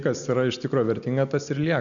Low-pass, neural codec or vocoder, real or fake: 7.2 kHz; none; real